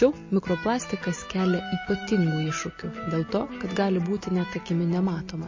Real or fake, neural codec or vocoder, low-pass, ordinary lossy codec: real; none; 7.2 kHz; MP3, 32 kbps